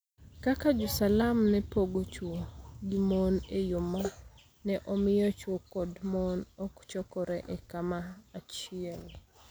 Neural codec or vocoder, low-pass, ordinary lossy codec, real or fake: none; none; none; real